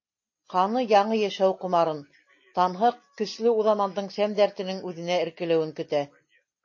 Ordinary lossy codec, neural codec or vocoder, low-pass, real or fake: MP3, 32 kbps; none; 7.2 kHz; real